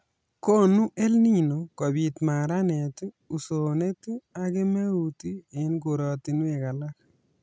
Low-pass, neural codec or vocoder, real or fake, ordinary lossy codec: none; none; real; none